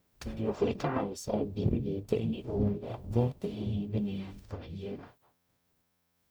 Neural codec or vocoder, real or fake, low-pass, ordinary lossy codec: codec, 44.1 kHz, 0.9 kbps, DAC; fake; none; none